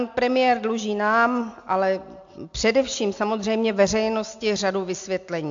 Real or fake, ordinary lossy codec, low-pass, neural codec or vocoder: real; AAC, 64 kbps; 7.2 kHz; none